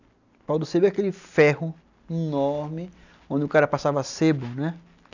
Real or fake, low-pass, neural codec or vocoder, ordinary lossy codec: real; 7.2 kHz; none; none